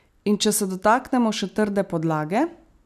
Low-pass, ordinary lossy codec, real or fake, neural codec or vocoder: 14.4 kHz; none; real; none